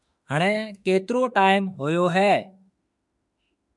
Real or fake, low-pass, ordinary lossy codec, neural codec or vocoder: fake; 10.8 kHz; MP3, 96 kbps; autoencoder, 48 kHz, 32 numbers a frame, DAC-VAE, trained on Japanese speech